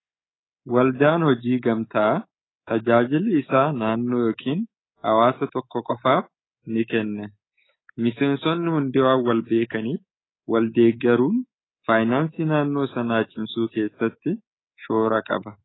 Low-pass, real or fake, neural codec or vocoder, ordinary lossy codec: 7.2 kHz; fake; codec, 24 kHz, 3.1 kbps, DualCodec; AAC, 16 kbps